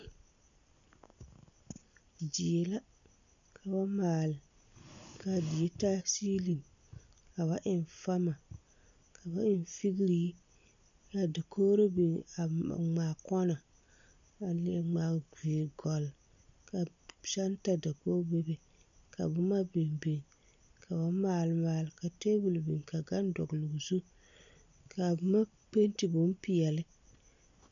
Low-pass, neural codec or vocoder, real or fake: 7.2 kHz; none; real